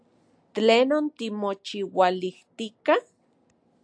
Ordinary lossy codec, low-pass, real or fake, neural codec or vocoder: AAC, 64 kbps; 9.9 kHz; real; none